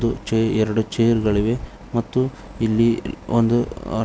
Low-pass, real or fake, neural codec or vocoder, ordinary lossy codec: none; real; none; none